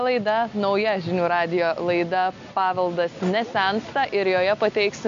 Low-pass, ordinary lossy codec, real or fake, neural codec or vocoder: 7.2 kHz; AAC, 48 kbps; real; none